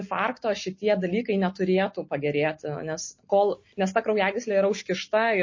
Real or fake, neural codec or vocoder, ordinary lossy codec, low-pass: real; none; MP3, 32 kbps; 7.2 kHz